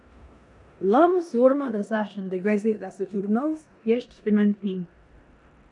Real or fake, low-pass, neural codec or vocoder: fake; 10.8 kHz; codec, 16 kHz in and 24 kHz out, 0.9 kbps, LongCat-Audio-Codec, four codebook decoder